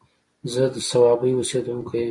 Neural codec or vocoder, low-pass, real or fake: none; 10.8 kHz; real